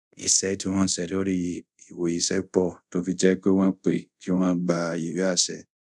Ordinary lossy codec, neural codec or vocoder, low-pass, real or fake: none; codec, 24 kHz, 0.5 kbps, DualCodec; none; fake